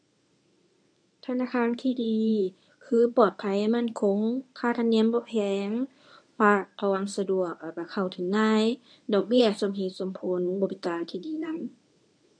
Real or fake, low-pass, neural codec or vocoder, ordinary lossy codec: fake; 9.9 kHz; codec, 24 kHz, 0.9 kbps, WavTokenizer, medium speech release version 1; none